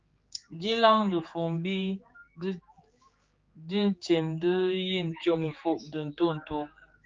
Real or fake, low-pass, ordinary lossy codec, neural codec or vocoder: fake; 7.2 kHz; Opus, 24 kbps; codec, 16 kHz, 4 kbps, X-Codec, HuBERT features, trained on general audio